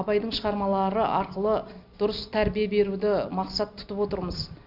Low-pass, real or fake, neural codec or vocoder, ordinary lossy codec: 5.4 kHz; real; none; Opus, 64 kbps